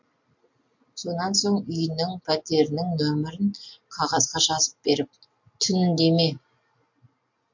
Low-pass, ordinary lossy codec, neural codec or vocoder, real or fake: 7.2 kHz; MP3, 48 kbps; none; real